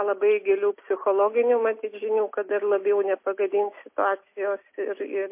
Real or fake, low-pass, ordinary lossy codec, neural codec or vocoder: real; 3.6 kHz; MP3, 24 kbps; none